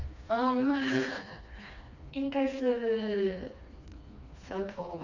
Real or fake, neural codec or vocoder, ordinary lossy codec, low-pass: fake; codec, 16 kHz, 2 kbps, FreqCodec, smaller model; none; 7.2 kHz